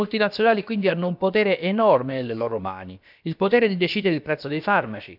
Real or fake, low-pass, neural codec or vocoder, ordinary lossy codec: fake; 5.4 kHz; codec, 16 kHz, about 1 kbps, DyCAST, with the encoder's durations; none